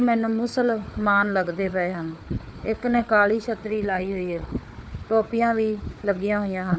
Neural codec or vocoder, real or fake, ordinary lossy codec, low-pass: codec, 16 kHz, 4 kbps, FunCodec, trained on Chinese and English, 50 frames a second; fake; none; none